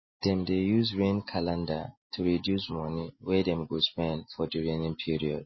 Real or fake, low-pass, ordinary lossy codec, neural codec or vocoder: real; 7.2 kHz; MP3, 24 kbps; none